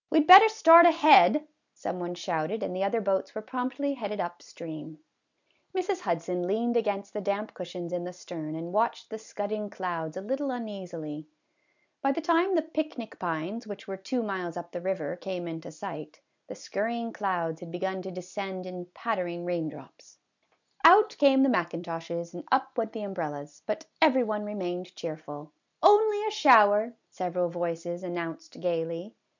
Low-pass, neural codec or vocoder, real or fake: 7.2 kHz; none; real